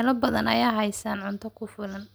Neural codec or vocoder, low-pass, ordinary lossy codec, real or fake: none; none; none; real